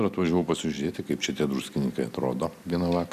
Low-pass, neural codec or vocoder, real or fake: 14.4 kHz; none; real